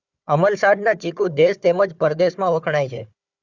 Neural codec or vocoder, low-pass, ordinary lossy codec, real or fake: codec, 16 kHz, 4 kbps, FunCodec, trained on Chinese and English, 50 frames a second; 7.2 kHz; Opus, 64 kbps; fake